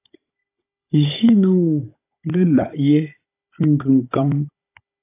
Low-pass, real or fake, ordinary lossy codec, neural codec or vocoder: 3.6 kHz; fake; AAC, 24 kbps; codec, 16 kHz, 16 kbps, FunCodec, trained on Chinese and English, 50 frames a second